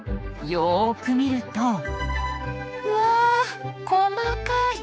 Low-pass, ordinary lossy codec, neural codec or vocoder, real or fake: none; none; codec, 16 kHz, 4 kbps, X-Codec, HuBERT features, trained on general audio; fake